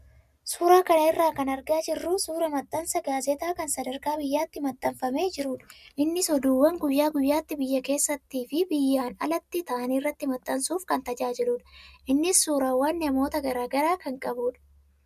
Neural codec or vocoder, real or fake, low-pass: none; real; 14.4 kHz